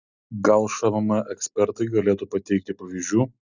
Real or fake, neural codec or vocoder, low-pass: real; none; 7.2 kHz